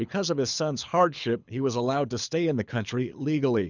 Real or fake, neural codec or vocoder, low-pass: fake; codec, 24 kHz, 6 kbps, HILCodec; 7.2 kHz